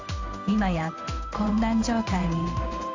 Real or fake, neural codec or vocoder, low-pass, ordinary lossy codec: fake; codec, 16 kHz in and 24 kHz out, 1 kbps, XY-Tokenizer; 7.2 kHz; AAC, 48 kbps